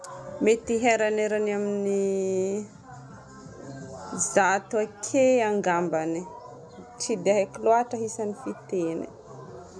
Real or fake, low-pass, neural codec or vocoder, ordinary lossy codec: real; none; none; none